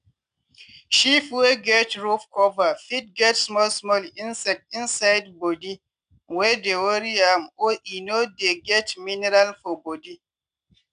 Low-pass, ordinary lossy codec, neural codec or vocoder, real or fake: 9.9 kHz; none; none; real